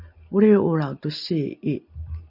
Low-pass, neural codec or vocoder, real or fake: 5.4 kHz; none; real